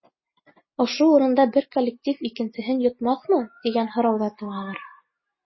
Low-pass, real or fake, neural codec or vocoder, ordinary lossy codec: 7.2 kHz; real; none; MP3, 24 kbps